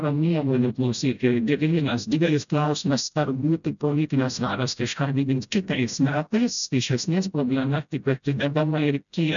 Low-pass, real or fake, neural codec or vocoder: 7.2 kHz; fake; codec, 16 kHz, 0.5 kbps, FreqCodec, smaller model